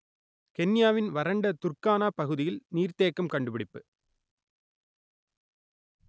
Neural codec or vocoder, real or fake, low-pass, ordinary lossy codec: none; real; none; none